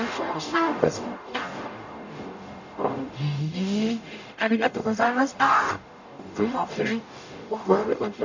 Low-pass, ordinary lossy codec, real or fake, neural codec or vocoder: 7.2 kHz; none; fake; codec, 44.1 kHz, 0.9 kbps, DAC